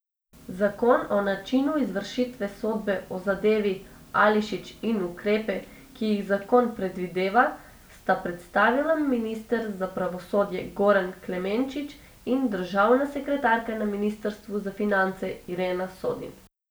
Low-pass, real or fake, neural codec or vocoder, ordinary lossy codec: none; real; none; none